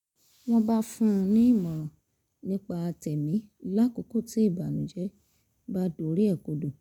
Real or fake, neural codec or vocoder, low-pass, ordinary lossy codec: real; none; 19.8 kHz; none